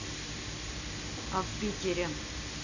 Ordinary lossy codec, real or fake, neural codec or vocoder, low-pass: none; real; none; 7.2 kHz